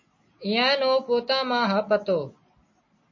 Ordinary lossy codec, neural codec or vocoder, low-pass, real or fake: MP3, 32 kbps; none; 7.2 kHz; real